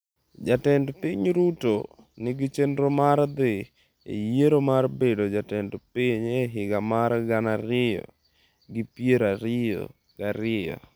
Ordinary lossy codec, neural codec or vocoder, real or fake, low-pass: none; none; real; none